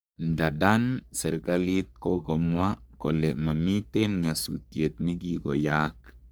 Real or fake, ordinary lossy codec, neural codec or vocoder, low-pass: fake; none; codec, 44.1 kHz, 3.4 kbps, Pupu-Codec; none